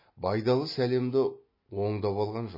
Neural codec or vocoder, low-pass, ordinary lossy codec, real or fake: none; 5.4 kHz; MP3, 24 kbps; real